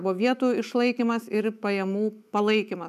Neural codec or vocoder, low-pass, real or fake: autoencoder, 48 kHz, 128 numbers a frame, DAC-VAE, trained on Japanese speech; 14.4 kHz; fake